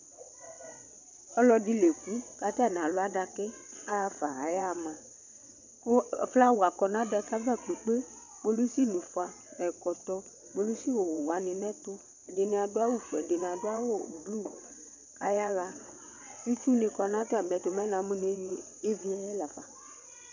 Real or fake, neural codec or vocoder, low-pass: fake; vocoder, 22.05 kHz, 80 mel bands, WaveNeXt; 7.2 kHz